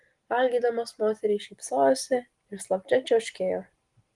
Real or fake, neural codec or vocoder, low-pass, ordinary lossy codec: real; none; 10.8 kHz; Opus, 24 kbps